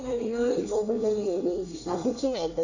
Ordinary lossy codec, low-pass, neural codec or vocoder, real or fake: none; 7.2 kHz; codec, 24 kHz, 1 kbps, SNAC; fake